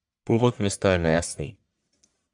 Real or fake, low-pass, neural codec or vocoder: fake; 10.8 kHz; codec, 44.1 kHz, 1.7 kbps, Pupu-Codec